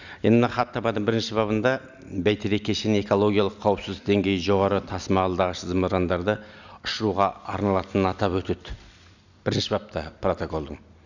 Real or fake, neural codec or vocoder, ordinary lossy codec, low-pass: real; none; none; 7.2 kHz